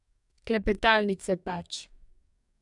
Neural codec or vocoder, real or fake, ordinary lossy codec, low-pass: codec, 44.1 kHz, 2.6 kbps, DAC; fake; none; 10.8 kHz